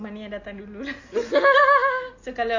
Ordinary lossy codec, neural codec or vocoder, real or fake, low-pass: AAC, 48 kbps; none; real; 7.2 kHz